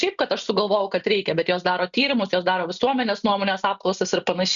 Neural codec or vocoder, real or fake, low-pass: none; real; 7.2 kHz